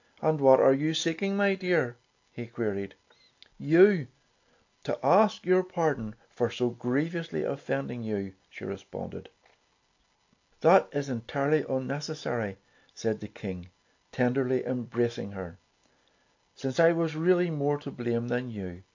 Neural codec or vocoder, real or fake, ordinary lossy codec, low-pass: none; real; AAC, 48 kbps; 7.2 kHz